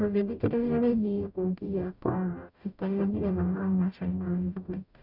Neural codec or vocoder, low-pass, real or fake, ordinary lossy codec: codec, 44.1 kHz, 0.9 kbps, DAC; 5.4 kHz; fake; none